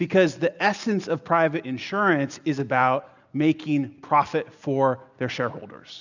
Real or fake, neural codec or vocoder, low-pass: real; none; 7.2 kHz